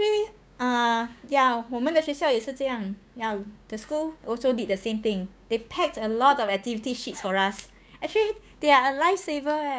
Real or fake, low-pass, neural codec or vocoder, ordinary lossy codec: fake; none; codec, 16 kHz, 6 kbps, DAC; none